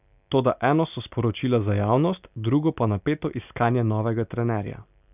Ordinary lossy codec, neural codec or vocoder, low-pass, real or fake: none; none; 3.6 kHz; real